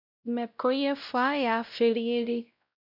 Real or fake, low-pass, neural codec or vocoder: fake; 5.4 kHz; codec, 16 kHz, 0.5 kbps, X-Codec, WavLM features, trained on Multilingual LibriSpeech